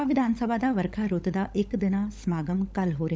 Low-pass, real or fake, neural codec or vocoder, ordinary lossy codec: none; fake; codec, 16 kHz, 16 kbps, FunCodec, trained on LibriTTS, 50 frames a second; none